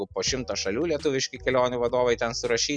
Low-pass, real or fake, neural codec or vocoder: 9.9 kHz; real; none